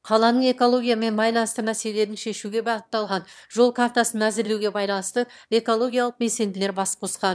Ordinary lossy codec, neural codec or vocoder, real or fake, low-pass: none; autoencoder, 22.05 kHz, a latent of 192 numbers a frame, VITS, trained on one speaker; fake; none